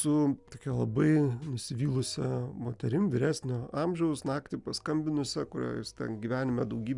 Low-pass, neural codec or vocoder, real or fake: 10.8 kHz; none; real